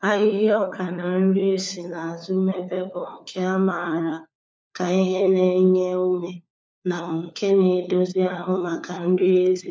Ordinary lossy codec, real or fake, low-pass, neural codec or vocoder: none; fake; none; codec, 16 kHz, 4 kbps, FunCodec, trained on LibriTTS, 50 frames a second